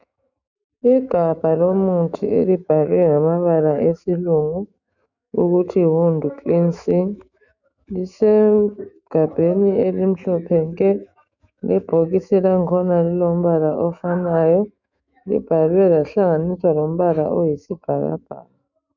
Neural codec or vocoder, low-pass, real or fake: autoencoder, 48 kHz, 128 numbers a frame, DAC-VAE, trained on Japanese speech; 7.2 kHz; fake